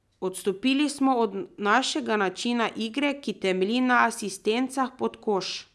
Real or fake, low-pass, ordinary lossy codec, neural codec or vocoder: real; none; none; none